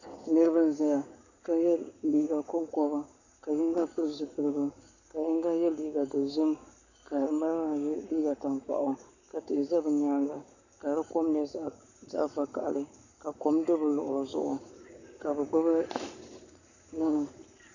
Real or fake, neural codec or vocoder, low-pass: fake; codec, 44.1 kHz, 7.8 kbps, Pupu-Codec; 7.2 kHz